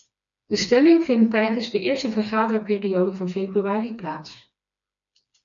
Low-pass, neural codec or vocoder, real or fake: 7.2 kHz; codec, 16 kHz, 2 kbps, FreqCodec, smaller model; fake